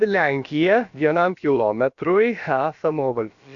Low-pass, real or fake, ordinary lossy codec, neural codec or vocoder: 7.2 kHz; fake; Opus, 64 kbps; codec, 16 kHz, about 1 kbps, DyCAST, with the encoder's durations